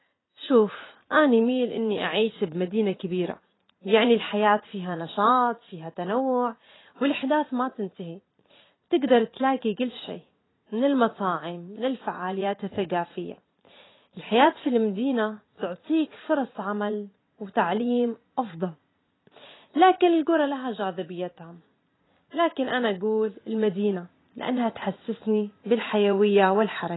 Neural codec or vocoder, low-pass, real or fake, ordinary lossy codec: vocoder, 44.1 kHz, 80 mel bands, Vocos; 7.2 kHz; fake; AAC, 16 kbps